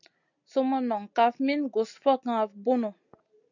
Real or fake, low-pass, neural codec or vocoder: real; 7.2 kHz; none